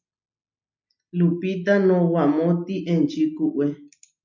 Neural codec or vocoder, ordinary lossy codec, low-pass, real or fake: none; MP3, 64 kbps; 7.2 kHz; real